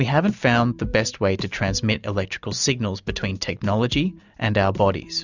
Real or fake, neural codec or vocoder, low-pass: real; none; 7.2 kHz